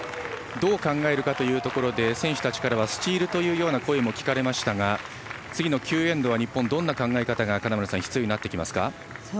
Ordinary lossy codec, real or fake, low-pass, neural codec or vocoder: none; real; none; none